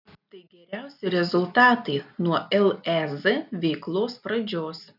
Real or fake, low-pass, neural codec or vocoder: real; 5.4 kHz; none